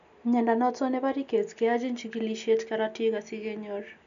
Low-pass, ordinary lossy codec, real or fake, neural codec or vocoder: 7.2 kHz; none; real; none